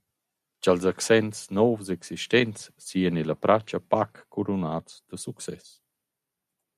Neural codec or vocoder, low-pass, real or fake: none; 14.4 kHz; real